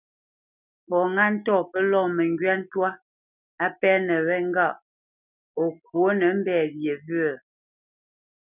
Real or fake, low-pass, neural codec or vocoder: real; 3.6 kHz; none